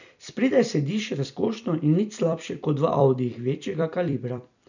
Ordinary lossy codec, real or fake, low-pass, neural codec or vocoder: none; fake; 7.2 kHz; vocoder, 44.1 kHz, 128 mel bands every 256 samples, BigVGAN v2